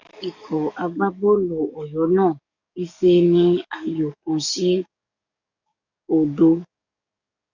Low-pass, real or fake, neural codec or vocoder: 7.2 kHz; fake; codec, 44.1 kHz, 7.8 kbps, DAC